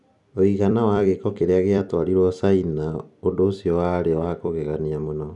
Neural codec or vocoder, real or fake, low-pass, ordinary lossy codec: vocoder, 44.1 kHz, 128 mel bands every 256 samples, BigVGAN v2; fake; 10.8 kHz; none